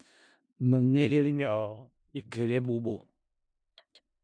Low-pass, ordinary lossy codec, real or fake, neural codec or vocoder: 9.9 kHz; MP3, 64 kbps; fake; codec, 16 kHz in and 24 kHz out, 0.4 kbps, LongCat-Audio-Codec, four codebook decoder